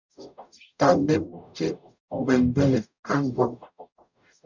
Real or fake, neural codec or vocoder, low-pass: fake; codec, 44.1 kHz, 0.9 kbps, DAC; 7.2 kHz